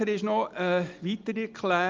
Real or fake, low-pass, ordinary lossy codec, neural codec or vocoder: real; 7.2 kHz; Opus, 32 kbps; none